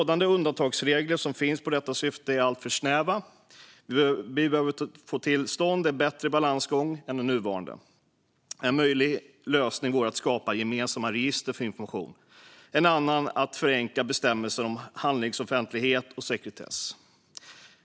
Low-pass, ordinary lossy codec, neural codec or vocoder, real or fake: none; none; none; real